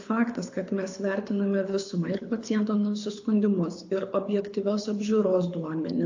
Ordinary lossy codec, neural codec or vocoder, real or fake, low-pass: AAC, 48 kbps; codec, 24 kHz, 6 kbps, HILCodec; fake; 7.2 kHz